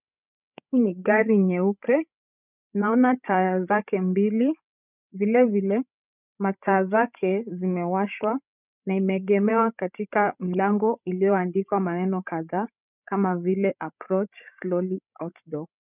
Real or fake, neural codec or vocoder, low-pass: fake; codec, 16 kHz, 16 kbps, FreqCodec, larger model; 3.6 kHz